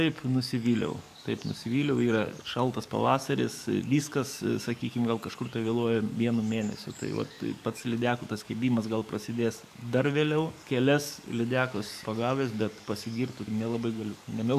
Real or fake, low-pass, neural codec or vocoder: fake; 14.4 kHz; codec, 44.1 kHz, 7.8 kbps, DAC